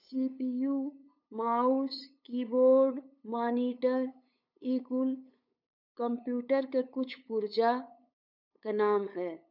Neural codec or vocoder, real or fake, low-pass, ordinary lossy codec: codec, 16 kHz, 8 kbps, FunCodec, trained on LibriTTS, 25 frames a second; fake; 5.4 kHz; none